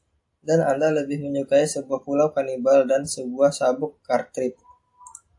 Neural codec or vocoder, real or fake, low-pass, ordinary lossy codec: none; real; 10.8 kHz; AAC, 64 kbps